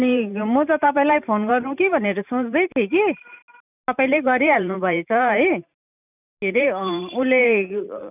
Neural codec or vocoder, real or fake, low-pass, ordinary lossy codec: vocoder, 44.1 kHz, 128 mel bands every 512 samples, BigVGAN v2; fake; 3.6 kHz; none